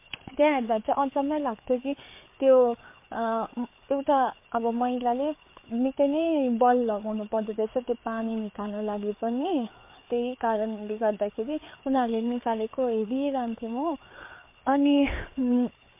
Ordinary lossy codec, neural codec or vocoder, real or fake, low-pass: MP3, 24 kbps; codec, 16 kHz, 4 kbps, FreqCodec, larger model; fake; 3.6 kHz